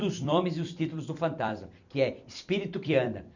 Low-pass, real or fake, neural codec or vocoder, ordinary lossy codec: 7.2 kHz; fake; vocoder, 44.1 kHz, 128 mel bands every 256 samples, BigVGAN v2; none